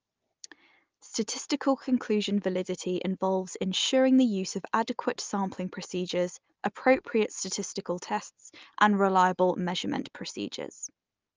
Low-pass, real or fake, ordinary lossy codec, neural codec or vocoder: 7.2 kHz; real; Opus, 24 kbps; none